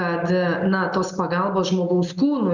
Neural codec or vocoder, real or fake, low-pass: none; real; 7.2 kHz